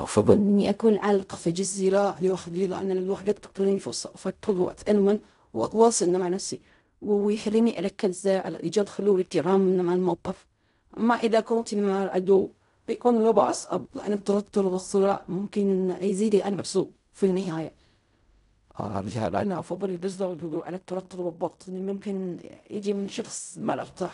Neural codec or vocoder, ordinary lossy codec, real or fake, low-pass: codec, 16 kHz in and 24 kHz out, 0.4 kbps, LongCat-Audio-Codec, fine tuned four codebook decoder; none; fake; 10.8 kHz